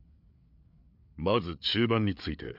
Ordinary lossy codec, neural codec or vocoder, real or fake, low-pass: none; codec, 16 kHz, 8 kbps, FreqCodec, larger model; fake; 5.4 kHz